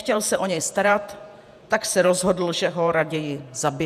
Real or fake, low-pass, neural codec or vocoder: fake; 14.4 kHz; vocoder, 48 kHz, 128 mel bands, Vocos